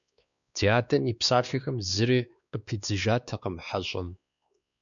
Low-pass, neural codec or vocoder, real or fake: 7.2 kHz; codec, 16 kHz, 2 kbps, X-Codec, WavLM features, trained on Multilingual LibriSpeech; fake